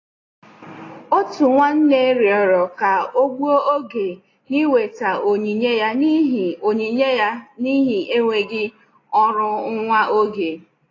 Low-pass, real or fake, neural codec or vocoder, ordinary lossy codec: 7.2 kHz; real; none; AAC, 32 kbps